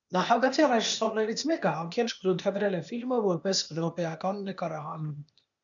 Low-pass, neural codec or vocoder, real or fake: 7.2 kHz; codec, 16 kHz, 0.8 kbps, ZipCodec; fake